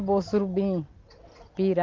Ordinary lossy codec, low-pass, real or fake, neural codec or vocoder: Opus, 32 kbps; 7.2 kHz; real; none